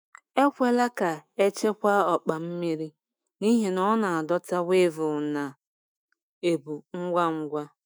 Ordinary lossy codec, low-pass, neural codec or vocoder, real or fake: none; none; autoencoder, 48 kHz, 128 numbers a frame, DAC-VAE, trained on Japanese speech; fake